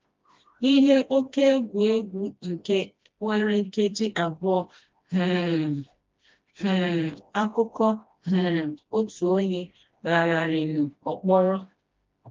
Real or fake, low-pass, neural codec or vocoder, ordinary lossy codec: fake; 7.2 kHz; codec, 16 kHz, 1 kbps, FreqCodec, smaller model; Opus, 32 kbps